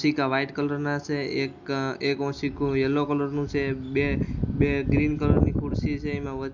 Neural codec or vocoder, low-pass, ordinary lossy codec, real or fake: none; 7.2 kHz; MP3, 64 kbps; real